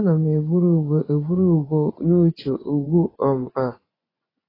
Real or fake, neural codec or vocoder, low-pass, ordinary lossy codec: fake; vocoder, 24 kHz, 100 mel bands, Vocos; 5.4 kHz; AAC, 24 kbps